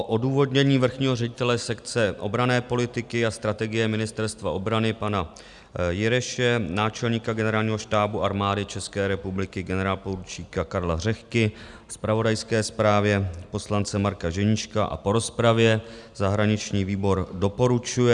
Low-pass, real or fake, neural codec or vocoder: 10.8 kHz; real; none